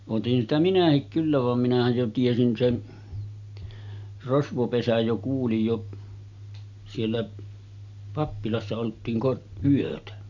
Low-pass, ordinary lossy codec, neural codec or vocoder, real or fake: 7.2 kHz; none; none; real